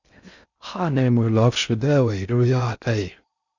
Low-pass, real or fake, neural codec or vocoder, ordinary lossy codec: 7.2 kHz; fake; codec, 16 kHz in and 24 kHz out, 0.6 kbps, FocalCodec, streaming, 2048 codes; Opus, 64 kbps